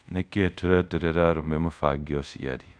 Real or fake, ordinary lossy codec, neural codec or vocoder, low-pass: fake; none; codec, 24 kHz, 0.5 kbps, DualCodec; 9.9 kHz